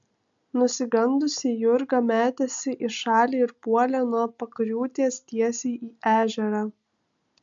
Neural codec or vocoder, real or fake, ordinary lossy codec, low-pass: none; real; MP3, 64 kbps; 7.2 kHz